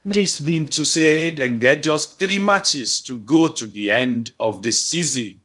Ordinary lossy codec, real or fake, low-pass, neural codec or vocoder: none; fake; 10.8 kHz; codec, 16 kHz in and 24 kHz out, 0.6 kbps, FocalCodec, streaming, 2048 codes